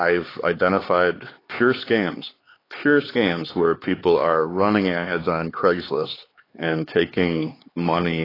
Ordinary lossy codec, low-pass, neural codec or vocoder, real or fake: AAC, 24 kbps; 5.4 kHz; codec, 16 kHz, 4 kbps, X-Codec, HuBERT features, trained on LibriSpeech; fake